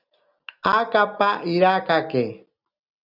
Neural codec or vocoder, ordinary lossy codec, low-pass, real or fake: none; Opus, 64 kbps; 5.4 kHz; real